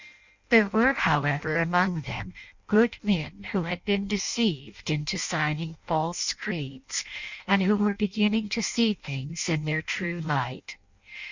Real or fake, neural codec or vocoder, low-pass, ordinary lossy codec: fake; codec, 16 kHz in and 24 kHz out, 0.6 kbps, FireRedTTS-2 codec; 7.2 kHz; Opus, 64 kbps